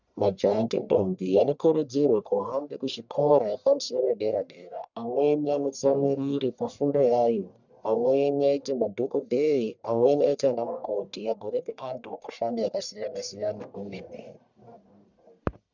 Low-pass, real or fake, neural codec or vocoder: 7.2 kHz; fake; codec, 44.1 kHz, 1.7 kbps, Pupu-Codec